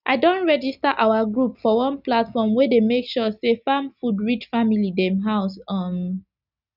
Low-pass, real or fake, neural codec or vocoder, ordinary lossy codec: 5.4 kHz; real; none; none